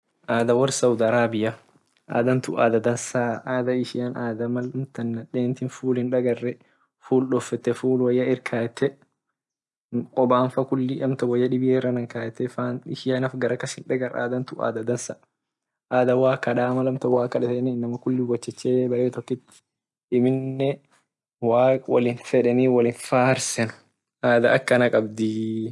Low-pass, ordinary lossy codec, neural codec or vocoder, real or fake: none; none; none; real